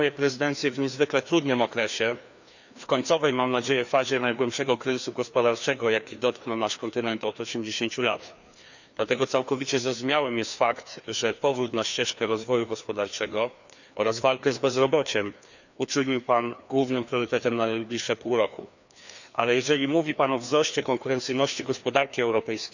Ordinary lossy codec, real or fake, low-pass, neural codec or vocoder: none; fake; 7.2 kHz; codec, 16 kHz, 2 kbps, FreqCodec, larger model